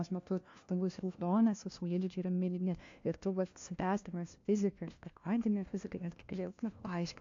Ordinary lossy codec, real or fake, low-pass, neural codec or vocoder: AAC, 48 kbps; fake; 7.2 kHz; codec, 16 kHz, 1 kbps, FunCodec, trained on LibriTTS, 50 frames a second